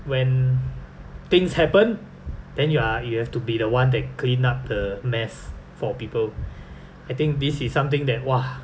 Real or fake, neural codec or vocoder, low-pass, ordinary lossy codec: real; none; none; none